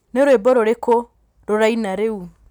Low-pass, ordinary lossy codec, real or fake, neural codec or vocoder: 19.8 kHz; none; real; none